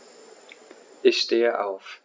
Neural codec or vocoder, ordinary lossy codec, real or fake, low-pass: none; none; real; none